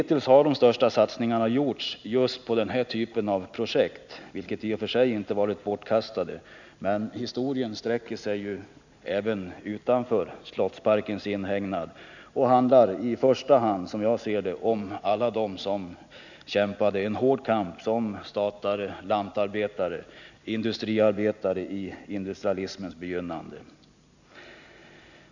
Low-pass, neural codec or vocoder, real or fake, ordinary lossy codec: 7.2 kHz; none; real; none